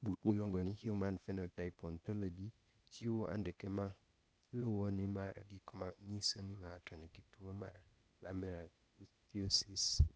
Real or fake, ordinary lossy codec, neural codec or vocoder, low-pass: fake; none; codec, 16 kHz, 0.8 kbps, ZipCodec; none